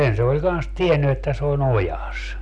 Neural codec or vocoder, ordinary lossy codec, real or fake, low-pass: none; none; real; none